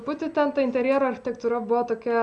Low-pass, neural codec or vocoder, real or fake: 10.8 kHz; none; real